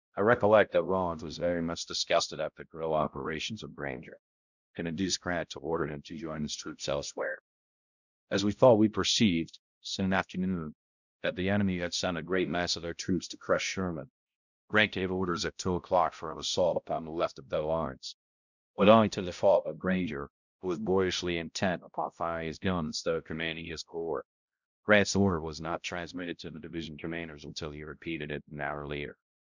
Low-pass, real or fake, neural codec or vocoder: 7.2 kHz; fake; codec, 16 kHz, 0.5 kbps, X-Codec, HuBERT features, trained on balanced general audio